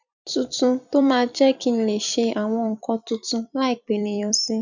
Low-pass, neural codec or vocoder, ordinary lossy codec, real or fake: 7.2 kHz; vocoder, 44.1 kHz, 128 mel bands every 256 samples, BigVGAN v2; none; fake